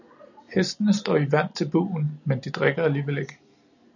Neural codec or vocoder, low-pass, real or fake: none; 7.2 kHz; real